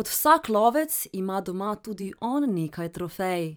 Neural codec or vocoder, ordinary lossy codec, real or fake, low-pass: vocoder, 44.1 kHz, 128 mel bands every 512 samples, BigVGAN v2; none; fake; none